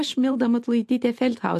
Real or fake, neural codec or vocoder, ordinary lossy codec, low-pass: fake; vocoder, 44.1 kHz, 128 mel bands every 512 samples, BigVGAN v2; MP3, 64 kbps; 14.4 kHz